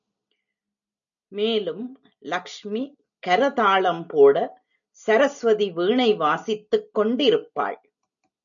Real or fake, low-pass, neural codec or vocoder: real; 7.2 kHz; none